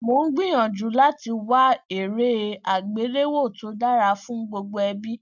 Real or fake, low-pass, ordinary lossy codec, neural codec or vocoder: real; 7.2 kHz; none; none